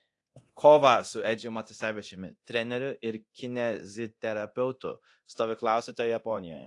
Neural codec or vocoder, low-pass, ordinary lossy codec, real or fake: codec, 24 kHz, 0.9 kbps, DualCodec; 10.8 kHz; AAC, 48 kbps; fake